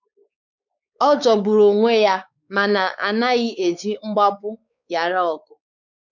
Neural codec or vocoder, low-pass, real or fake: codec, 16 kHz, 4 kbps, X-Codec, WavLM features, trained on Multilingual LibriSpeech; 7.2 kHz; fake